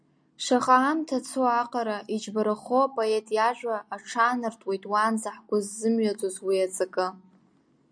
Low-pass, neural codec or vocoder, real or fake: 9.9 kHz; none; real